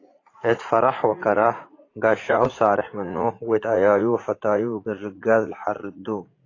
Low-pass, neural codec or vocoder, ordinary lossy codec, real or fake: 7.2 kHz; vocoder, 44.1 kHz, 80 mel bands, Vocos; AAC, 32 kbps; fake